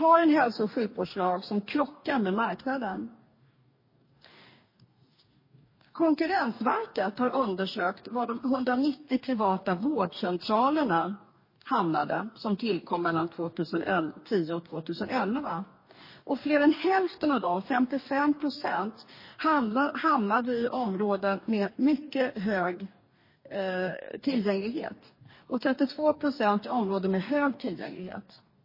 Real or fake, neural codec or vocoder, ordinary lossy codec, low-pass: fake; codec, 44.1 kHz, 2.6 kbps, DAC; MP3, 24 kbps; 5.4 kHz